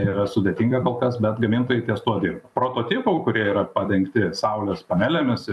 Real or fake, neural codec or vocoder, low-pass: fake; vocoder, 44.1 kHz, 128 mel bands every 256 samples, BigVGAN v2; 14.4 kHz